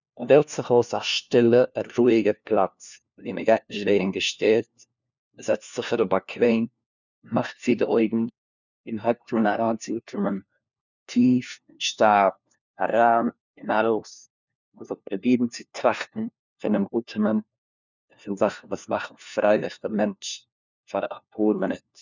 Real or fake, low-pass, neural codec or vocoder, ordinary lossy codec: fake; 7.2 kHz; codec, 16 kHz, 1 kbps, FunCodec, trained on LibriTTS, 50 frames a second; none